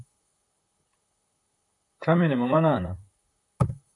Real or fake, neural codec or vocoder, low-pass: fake; vocoder, 44.1 kHz, 128 mel bands, Pupu-Vocoder; 10.8 kHz